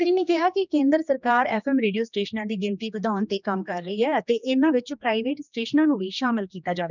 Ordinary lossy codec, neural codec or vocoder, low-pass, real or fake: none; codec, 16 kHz, 2 kbps, X-Codec, HuBERT features, trained on general audio; 7.2 kHz; fake